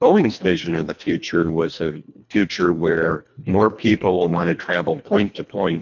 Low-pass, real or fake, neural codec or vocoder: 7.2 kHz; fake; codec, 24 kHz, 1.5 kbps, HILCodec